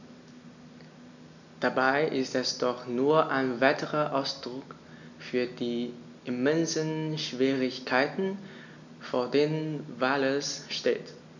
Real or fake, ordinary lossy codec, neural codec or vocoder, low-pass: real; none; none; 7.2 kHz